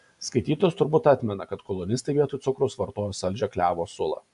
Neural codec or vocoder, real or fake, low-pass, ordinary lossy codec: none; real; 10.8 kHz; AAC, 96 kbps